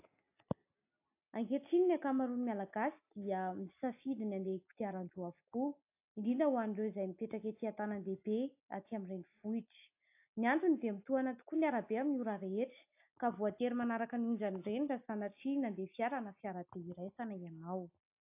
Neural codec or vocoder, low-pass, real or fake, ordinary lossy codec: none; 3.6 kHz; real; AAC, 32 kbps